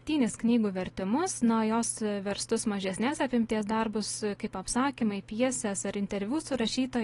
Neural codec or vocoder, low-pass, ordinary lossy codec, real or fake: none; 10.8 kHz; AAC, 32 kbps; real